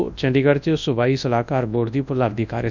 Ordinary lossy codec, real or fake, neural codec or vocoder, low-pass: none; fake; codec, 24 kHz, 0.9 kbps, WavTokenizer, large speech release; 7.2 kHz